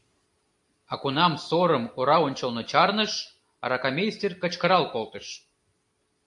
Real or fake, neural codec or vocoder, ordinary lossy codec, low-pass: real; none; AAC, 64 kbps; 10.8 kHz